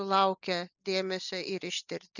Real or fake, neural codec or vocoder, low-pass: real; none; 7.2 kHz